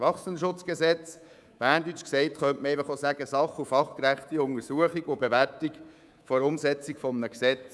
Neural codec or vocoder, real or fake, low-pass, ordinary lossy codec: codec, 24 kHz, 3.1 kbps, DualCodec; fake; none; none